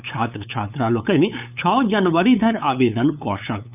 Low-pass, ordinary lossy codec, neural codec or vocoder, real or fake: 3.6 kHz; none; codec, 16 kHz, 8 kbps, FunCodec, trained on LibriTTS, 25 frames a second; fake